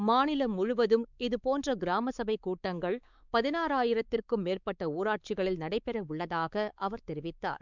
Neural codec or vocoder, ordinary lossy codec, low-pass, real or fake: codec, 16 kHz, 4 kbps, X-Codec, WavLM features, trained on Multilingual LibriSpeech; none; 7.2 kHz; fake